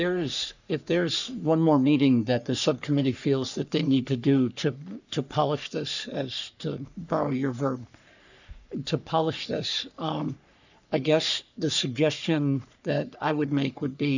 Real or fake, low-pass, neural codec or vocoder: fake; 7.2 kHz; codec, 44.1 kHz, 3.4 kbps, Pupu-Codec